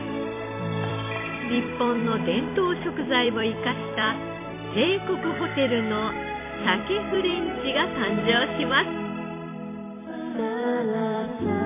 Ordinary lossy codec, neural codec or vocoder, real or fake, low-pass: MP3, 24 kbps; vocoder, 44.1 kHz, 128 mel bands every 256 samples, BigVGAN v2; fake; 3.6 kHz